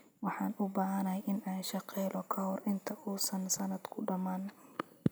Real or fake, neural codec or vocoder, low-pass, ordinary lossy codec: real; none; none; none